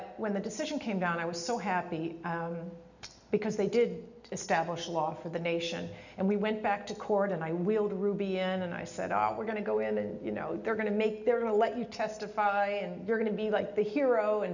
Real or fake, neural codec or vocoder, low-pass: real; none; 7.2 kHz